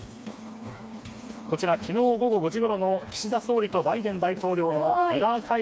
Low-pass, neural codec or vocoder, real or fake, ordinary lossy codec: none; codec, 16 kHz, 2 kbps, FreqCodec, smaller model; fake; none